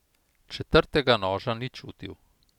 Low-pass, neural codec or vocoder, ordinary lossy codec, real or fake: 19.8 kHz; none; none; real